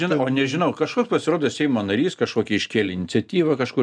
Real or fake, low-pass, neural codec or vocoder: real; 9.9 kHz; none